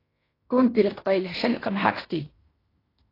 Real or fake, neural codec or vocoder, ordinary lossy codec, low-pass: fake; codec, 16 kHz in and 24 kHz out, 0.9 kbps, LongCat-Audio-Codec, fine tuned four codebook decoder; AAC, 24 kbps; 5.4 kHz